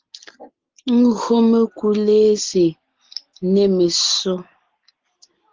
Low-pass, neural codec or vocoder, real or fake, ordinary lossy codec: 7.2 kHz; none; real; Opus, 16 kbps